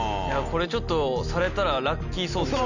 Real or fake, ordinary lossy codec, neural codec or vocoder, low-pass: real; none; none; 7.2 kHz